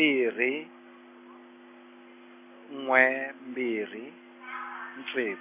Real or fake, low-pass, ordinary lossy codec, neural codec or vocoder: real; 3.6 kHz; MP3, 24 kbps; none